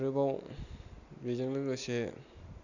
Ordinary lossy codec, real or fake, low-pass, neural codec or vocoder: none; real; 7.2 kHz; none